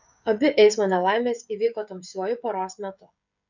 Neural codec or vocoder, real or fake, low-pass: codec, 16 kHz, 16 kbps, FreqCodec, smaller model; fake; 7.2 kHz